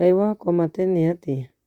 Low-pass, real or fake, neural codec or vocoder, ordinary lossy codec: 19.8 kHz; fake; vocoder, 44.1 kHz, 128 mel bands, Pupu-Vocoder; Opus, 64 kbps